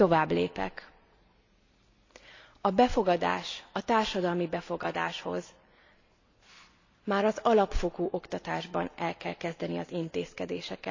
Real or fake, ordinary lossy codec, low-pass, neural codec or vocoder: real; AAC, 48 kbps; 7.2 kHz; none